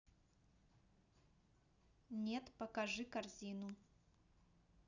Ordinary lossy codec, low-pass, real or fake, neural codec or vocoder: none; 7.2 kHz; real; none